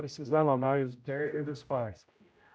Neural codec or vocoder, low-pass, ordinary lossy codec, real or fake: codec, 16 kHz, 0.5 kbps, X-Codec, HuBERT features, trained on general audio; none; none; fake